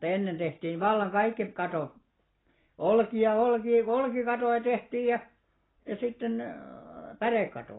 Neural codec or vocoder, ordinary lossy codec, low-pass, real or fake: none; AAC, 16 kbps; 7.2 kHz; real